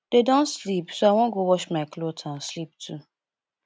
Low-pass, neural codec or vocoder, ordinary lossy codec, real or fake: none; none; none; real